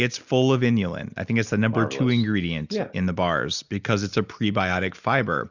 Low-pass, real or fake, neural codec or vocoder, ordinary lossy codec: 7.2 kHz; real; none; Opus, 64 kbps